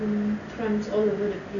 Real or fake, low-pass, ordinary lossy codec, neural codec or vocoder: real; 7.2 kHz; none; none